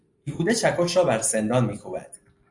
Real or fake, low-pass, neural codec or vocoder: real; 10.8 kHz; none